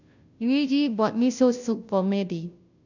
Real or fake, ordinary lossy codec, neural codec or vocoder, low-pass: fake; none; codec, 16 kHz, 0.5 kbps, FunCodec, trained on Chinese and English, 25 frames a second; 7.2 kHz